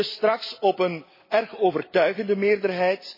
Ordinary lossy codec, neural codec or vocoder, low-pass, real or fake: MP3, 24 kbps; none; 5.4 kHz; real